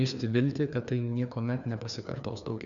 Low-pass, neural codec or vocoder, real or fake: 7.2 kHz; codec, 16 kHz, 2 kbps, FreqCodec, larger model; fake